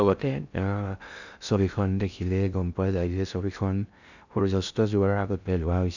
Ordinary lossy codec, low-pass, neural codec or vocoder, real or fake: none; 7.2 kHz; codec, 16 kHz in and 24 kHz out, 0.6 kbps, FocalCodec, streaming, 2048 codes; fake